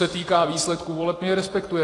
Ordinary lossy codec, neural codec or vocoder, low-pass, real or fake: AAC, 32 kbps; vocoder, 44.1 kHz, 128 mel bands every 256 samples, BigVGAN v2; 10.8 kHz; fake